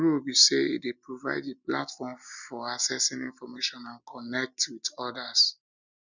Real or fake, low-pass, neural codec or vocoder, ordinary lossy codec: real; 7.2 kHz; none; none